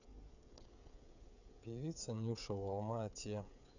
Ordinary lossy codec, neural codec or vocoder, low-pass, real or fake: none; codec, 16 kHz, 16 kbps, FreqCodec, smaller model; 7.2 kHz; fake